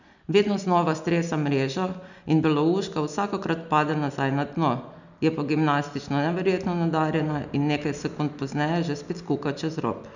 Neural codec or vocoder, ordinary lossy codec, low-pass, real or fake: none; none; 7.2 kHz; real